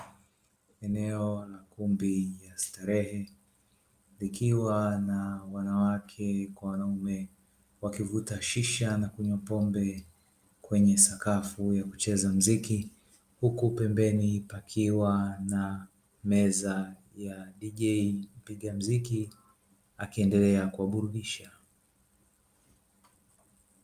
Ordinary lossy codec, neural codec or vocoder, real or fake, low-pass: Opus, 32 kbps; none; real; 14.4 kHz